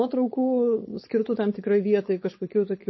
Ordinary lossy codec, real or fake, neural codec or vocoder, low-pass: MP3, 24 kbps; fake; codec, 16 kHz, 4.8 kbps, FACodec; 7.2 kHz